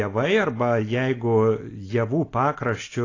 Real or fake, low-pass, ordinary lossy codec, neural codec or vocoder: real; 7.2 kHz; AAC, 32 kbps; none